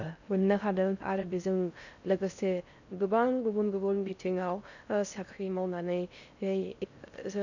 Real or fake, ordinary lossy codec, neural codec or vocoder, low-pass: fake; none; codec, 16 kHz in and 24 kHz out, 0.6 kbps, FocalCodec, streaming, 4096 codes; 7.2 kHz